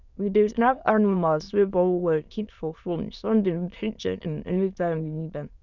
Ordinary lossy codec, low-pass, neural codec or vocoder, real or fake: none; 7.2 kHz; autoencoder, 22.05 kHz, a latent of 192 numbers a frame, VITS, trained on many speakers; fake